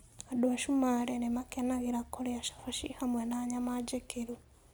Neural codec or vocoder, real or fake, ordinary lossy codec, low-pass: none; real; none; none